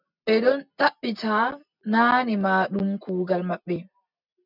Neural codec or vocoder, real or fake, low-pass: none; real; 5.4 kHz